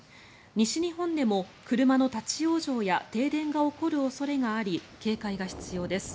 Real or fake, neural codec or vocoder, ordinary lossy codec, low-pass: real; none; none; none